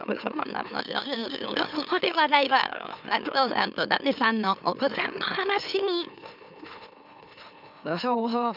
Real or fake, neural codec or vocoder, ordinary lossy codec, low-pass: fake; autoencoder, 44.1 kHz, a latent of 192 numbers a frame, MeloTTS; none; 5.4 kHz